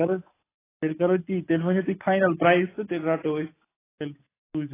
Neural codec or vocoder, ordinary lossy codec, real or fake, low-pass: none; AAC, 16 kbps; real; 3.6 kHz